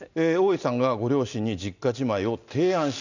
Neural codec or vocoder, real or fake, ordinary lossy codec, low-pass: none; real; none; 7.2 kHz